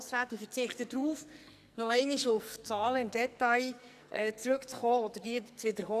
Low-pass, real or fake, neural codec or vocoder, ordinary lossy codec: 14.4 kHz; fake; codec, 44.1 kHz, 2.6 kbps, SNAC; none